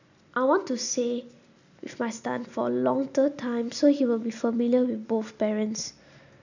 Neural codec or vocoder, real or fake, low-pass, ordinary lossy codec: none; real; 7.2 kHz; none